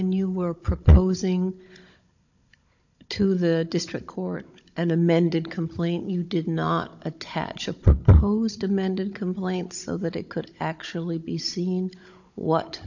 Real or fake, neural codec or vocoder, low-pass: fake; codec, 16 kHz, 16 kbps, FunCodec, trained on Chinese and English, 50 frames a second; 7.2 kHz